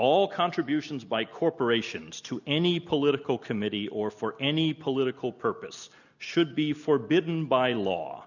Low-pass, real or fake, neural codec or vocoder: 7.2 kHz; real; none